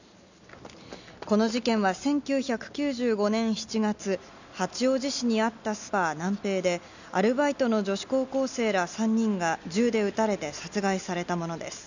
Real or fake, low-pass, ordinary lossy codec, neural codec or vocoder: real; 7.2 kHz; none; none